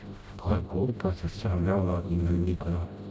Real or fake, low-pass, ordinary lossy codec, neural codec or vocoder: fake; none; none; codec, 16 kHz, 0.5 kbps, FreqCodec, smaller model